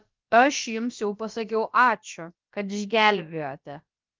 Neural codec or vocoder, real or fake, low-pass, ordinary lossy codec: codec, 16 kHz, about 1 kbps, DyCAST, with the encoder's durations; fake; 7.2 kHz; Opus, 24 kbps